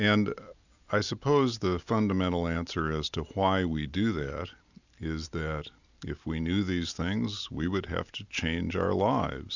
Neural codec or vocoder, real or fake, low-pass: none; real; 7.2 kHz